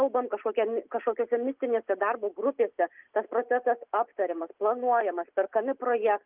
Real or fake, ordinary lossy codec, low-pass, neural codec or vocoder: real; Opus, 24 kbps; 3.6 kHz; none